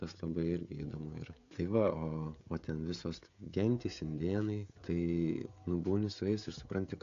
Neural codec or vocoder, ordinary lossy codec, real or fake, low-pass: codec, 16 kHz, 16 kbps, FreqCodec, smaller model; AAC, 48 kbps; fake; 7.2 kHz